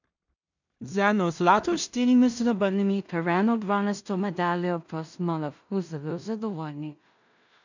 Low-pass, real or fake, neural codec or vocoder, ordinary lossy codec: 7.2 kHz; fake; codec, 16 kHz in and 24 kHz out, 0.4 kbps, LongCat-Audio-Codec, two codebook decoder; none